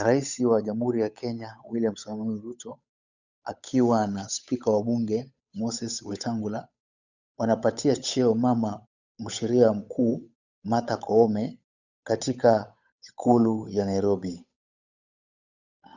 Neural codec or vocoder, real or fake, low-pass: codec, 16 kHz, 8 kbps, FunCodec, trained on Chinese and English, 25 frames a second; fake; 7.2 kHz